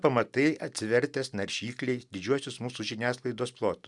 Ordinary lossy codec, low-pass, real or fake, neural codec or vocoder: MP3, 96 kbps; 10.8 kHz; real; none